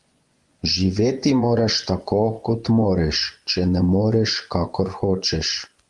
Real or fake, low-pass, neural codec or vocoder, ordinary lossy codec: fake; 10.8 kHz; vocoder, 24 kHz, 100 mel bands, Vocos; Opus, 24 kbps